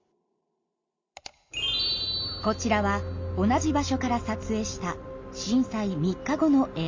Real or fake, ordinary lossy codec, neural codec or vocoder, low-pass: real; MP3, 48 kbps; none; 7.2 kHz